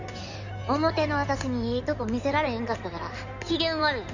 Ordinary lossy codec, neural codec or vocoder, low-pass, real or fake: none; codec, 16 kHz in and 24 kHz out, 2.2 kbps, FireRedTTS-2 codec; 7.2 kHz; fake